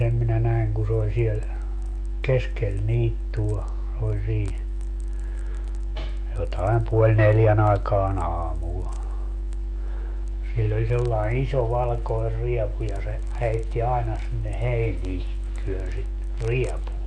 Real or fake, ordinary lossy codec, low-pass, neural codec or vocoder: fake; AAC, 64 kbps; 9.9 kHz; autoencoder, 48 kHz, 128 numbers a frame, DAC-VAE, trained on Japanese speech